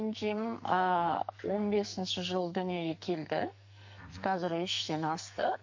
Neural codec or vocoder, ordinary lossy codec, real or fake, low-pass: codec, 44.1 kHz, 2.6 kbps, SNAC; MP3, 48 kbps; fake; 7.2 kHz